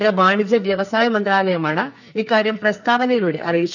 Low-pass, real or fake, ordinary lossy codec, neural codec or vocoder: 7.2 kHz; fake; none; codec, 44.1 kHz, 2.6 kbps, SNAC